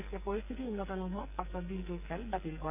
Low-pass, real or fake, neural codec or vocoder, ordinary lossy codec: 3.6 kHz; fake; codec, 44.1 kHz, 2.6 kbps, SNAC; none